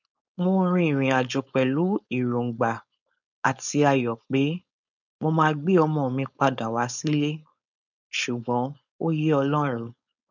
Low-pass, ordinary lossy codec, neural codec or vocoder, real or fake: 7.2 kHz; none; codec, 16 kHz, 4.8 kbps, FACodec; fake